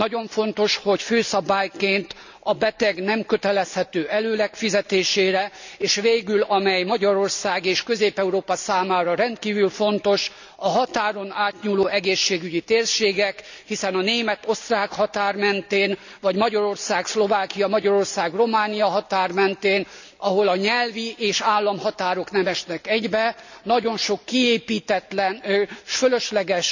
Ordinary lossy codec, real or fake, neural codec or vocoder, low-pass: none; real; none; 7.2 kHz